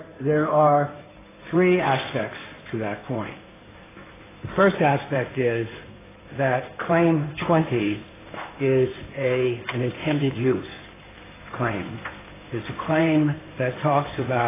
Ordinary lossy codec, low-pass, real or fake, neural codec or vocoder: AAC, 16 kbps; 3.6 kHz; fake; codec, 16 kHz in and 24 kHz out, 2.2 kbps, FireRedTTS-2 codec